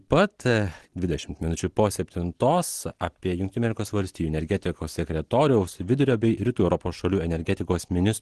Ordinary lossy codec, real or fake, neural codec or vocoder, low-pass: Opus, 16 kbps; real; none; 10.8 kHz